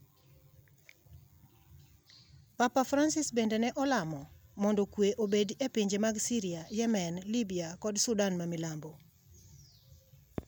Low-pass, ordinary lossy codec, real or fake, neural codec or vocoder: none; none; real; none